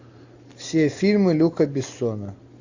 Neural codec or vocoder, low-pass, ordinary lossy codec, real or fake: none; 7.2 kHz; AAC, 48 kbps; real